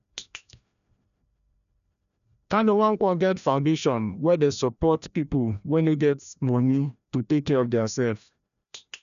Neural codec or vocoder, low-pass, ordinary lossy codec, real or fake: codec, 16 kHz, 1 kbps, FreqCodec, larger model; 7.2 kHz; none; fake